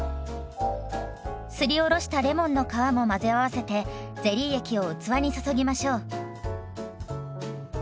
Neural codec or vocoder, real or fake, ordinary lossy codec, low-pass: none; real; none; none